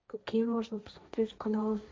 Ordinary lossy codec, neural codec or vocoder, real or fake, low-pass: none; codec, 16 kHz, 1.1 kbps, Voila-Tokenizer; fake; none